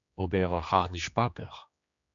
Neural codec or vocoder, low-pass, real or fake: codec, 16 kHz, 2 kbps, X-Codec, HuBERT features, trained on general audio; 7.2 kHz; fake